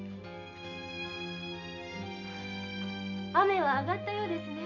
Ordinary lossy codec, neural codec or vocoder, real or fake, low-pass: none; none; real; 7.2 kHz